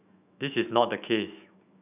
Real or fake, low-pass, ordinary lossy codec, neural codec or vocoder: fake; 3.6 kHz; none; autoencoder, 48 kHz, 128 numbers a frame, DAC-VAE, trained on Japanese speech